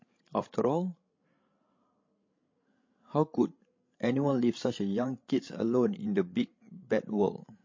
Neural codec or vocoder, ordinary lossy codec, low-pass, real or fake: codec, 16 kHz, 16 kbps, FreqCodec, larger model; MP3, 32 kbps; 7.2 kHz; fake